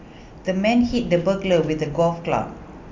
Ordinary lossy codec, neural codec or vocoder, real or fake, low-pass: MP3, 64 kbps; none; real; 7.2 kHz